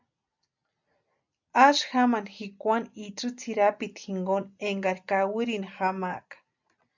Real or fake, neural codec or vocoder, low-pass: real; none; 7.2 kHz